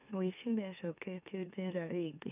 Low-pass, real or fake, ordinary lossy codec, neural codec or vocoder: 3.6 kHz; fake; none; autoencoder, 44.1 kHz, a latent of 192 numbers a frame, MeloTTS